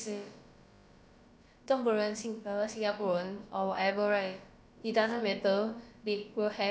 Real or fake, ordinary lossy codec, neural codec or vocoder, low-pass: fake; none; codec, 16 kHz, about 1 kbps, DyCAST, with the encoder's durations; none